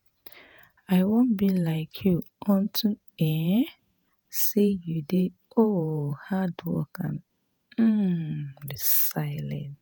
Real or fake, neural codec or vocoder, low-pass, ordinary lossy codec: fake; vocoder, 48 kHz, 128 mel bands, Vocos; none; none